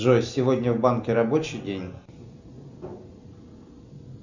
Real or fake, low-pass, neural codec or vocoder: real; 7.2 kHz; none